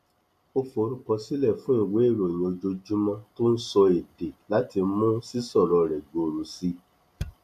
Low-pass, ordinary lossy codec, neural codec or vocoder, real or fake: 14.4 kHz; none; none; real